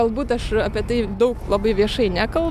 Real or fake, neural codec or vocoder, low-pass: real; none; 14.4 kHz